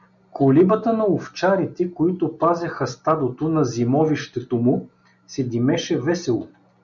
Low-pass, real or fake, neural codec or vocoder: 7.2 kHz; real; none